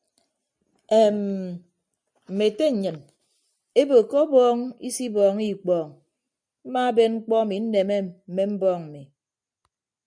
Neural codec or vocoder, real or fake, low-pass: none; real; 9.9 kHz